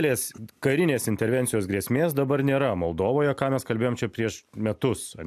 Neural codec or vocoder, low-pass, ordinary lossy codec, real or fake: none; 14.4 kHz; AAC, 96 kbps; real